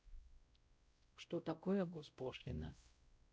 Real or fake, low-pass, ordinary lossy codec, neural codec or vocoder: fake; none; none; codec, 16 kHz, 0.5 kbps, X-Codec, WavLM features, trained on Multilingual LibriSpeech